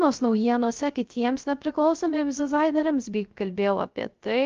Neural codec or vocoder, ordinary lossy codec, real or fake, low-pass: codec, 16 kHz, 0.3 kbps, FocalCodec; Opus, 32 kbps; fake; 7.2 kHz